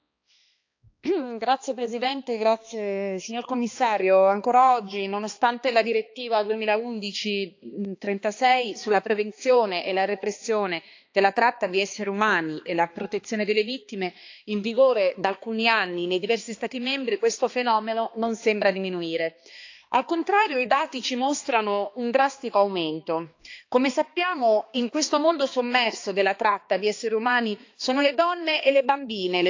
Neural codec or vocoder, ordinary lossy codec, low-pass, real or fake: codec, 16 kHz, 2 kbps, X-Codec, HuBERT features, trained on balanced general audio; AAC, 48 kbps; 7.2 kHz; fake